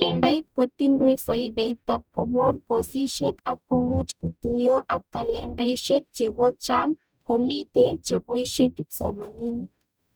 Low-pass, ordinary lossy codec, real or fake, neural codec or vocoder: none; none; fake; codec, 44.1 kHz, 0.9 kbps, DAC